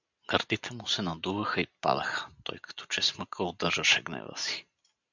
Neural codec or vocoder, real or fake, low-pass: none; real; 7.2 kHz